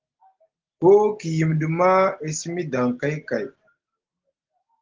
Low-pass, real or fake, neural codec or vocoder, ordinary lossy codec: 7.2 kHz; real; none; Opus, 16 kbps